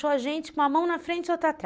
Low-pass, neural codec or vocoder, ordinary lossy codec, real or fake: none; none; none; real